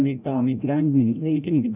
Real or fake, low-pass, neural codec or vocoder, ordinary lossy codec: fake; 3.6 kHz; codec, 16 kHz, 0.5 kbps, FreqCodec, larger model; none